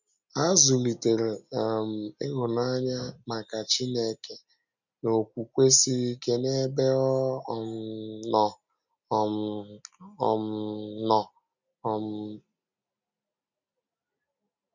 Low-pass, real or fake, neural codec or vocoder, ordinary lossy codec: 7.2 kHz; real; none; none